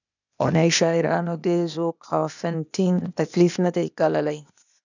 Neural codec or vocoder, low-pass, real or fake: codec, 16 kHz, 0.8 kbps, ZipCodec; 7.2 kHz; fake